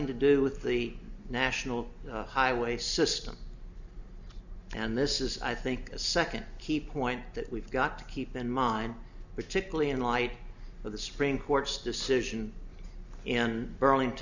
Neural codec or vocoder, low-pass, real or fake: none; 7.2 kHz; real